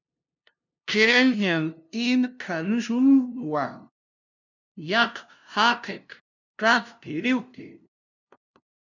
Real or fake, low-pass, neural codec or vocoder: fake; 7.2 kHz; codec, 16 kHz, 0.5 kbps, FunCodec, trained on LibriTTS, 25 frames a second